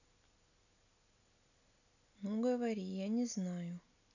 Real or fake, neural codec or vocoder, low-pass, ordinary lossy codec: real; none; 7.2 kHz; none